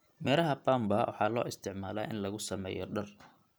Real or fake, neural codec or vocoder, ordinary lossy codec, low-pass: real; none; none; none